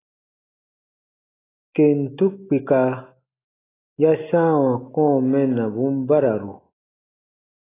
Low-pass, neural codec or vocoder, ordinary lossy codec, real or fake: 3.6 kHz; none; AAC, 16 kbps; real